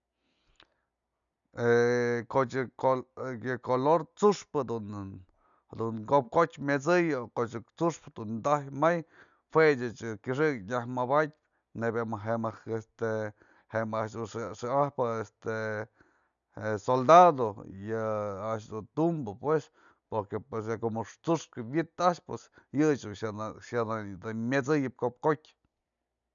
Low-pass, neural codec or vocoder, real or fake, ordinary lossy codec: 7.2 kHz; none; real; none